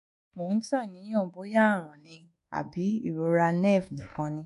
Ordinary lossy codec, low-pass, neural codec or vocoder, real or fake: AAC, 64 kbps; 10.8 kHz; codec, 24 kHz, 1.2 kbps, DualCodec; fake